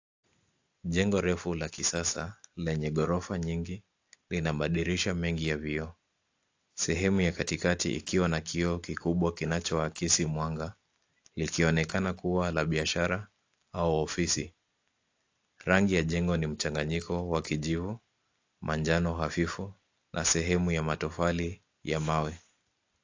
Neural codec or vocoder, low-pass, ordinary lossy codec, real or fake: none; 7.2 kHz; AAC, 48 kbps; real